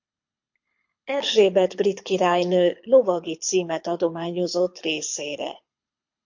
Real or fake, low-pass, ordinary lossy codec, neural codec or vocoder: fake; 7.2 kHz; MP3, 48 kbps; codec, 24 kHz, 6 kbps, HILCodec